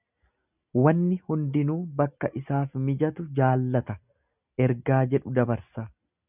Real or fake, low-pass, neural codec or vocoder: real; 3.6 kHz; none